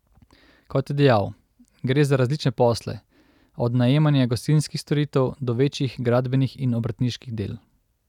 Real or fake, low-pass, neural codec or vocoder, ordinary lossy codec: real; 19.8 kHz; none; none